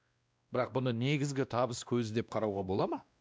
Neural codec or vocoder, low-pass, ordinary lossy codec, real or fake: codec, 16 kHz, 1 kbps, X-Codec, WavLM features, trained on Multilingual LibriSpeech; none; none; fake